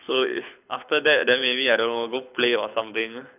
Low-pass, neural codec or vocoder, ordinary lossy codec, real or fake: 3.6 kHz; codec, 24 kHz, 6 kbps, HILCodec; none; fake